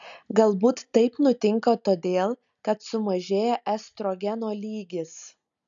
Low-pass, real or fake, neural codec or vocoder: 7.2 kHz; real; none